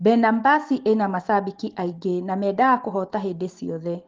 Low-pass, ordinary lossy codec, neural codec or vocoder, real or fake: 7.2 kHz; Opus, 16 kbps; none; real